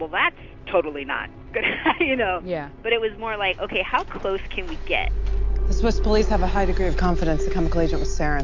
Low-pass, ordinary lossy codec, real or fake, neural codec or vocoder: 7.2 kHz; MP3, 48 kbps; real; none